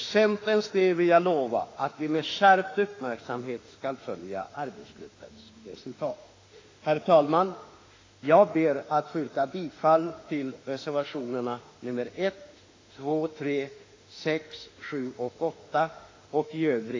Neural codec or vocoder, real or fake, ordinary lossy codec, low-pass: autoencoder, 48 kHz, 32 numbers a frame, DAC-VAE, trained on Japanese speech; fake; AAC, 32 kbps; 7.2 kHz